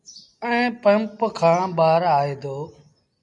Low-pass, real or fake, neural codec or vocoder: 10.8 kHz; real; none